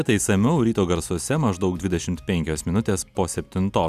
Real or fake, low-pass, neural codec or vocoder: real; 14.4 kHz; none